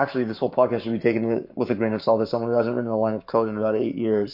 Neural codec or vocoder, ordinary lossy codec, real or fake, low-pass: codec, 44.1 kHz, 7.8 kbps, Pupu-Codec; MP3, 32 kbps; fake; 5.4 kHz